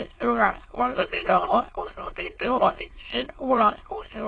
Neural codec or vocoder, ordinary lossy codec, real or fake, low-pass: autoencoder, 22.05 kHz, a latent of 192 numbers a frame, VITS, trained on many speakers; AAC, 32 kbps; fake; 9.9 kHz